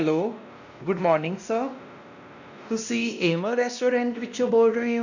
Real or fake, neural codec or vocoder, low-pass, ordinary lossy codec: fake; codec, 16 kHz, 1 kbps, X-Codec, WavLM features, trained on Multilingual LibriSpeech; 7.2 kHz; none